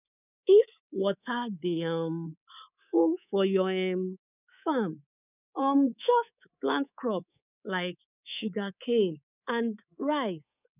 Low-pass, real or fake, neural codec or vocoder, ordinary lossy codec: 3.6 kHz; fake; codec, 24 kHz, 3.1 kbps, DualCodec; none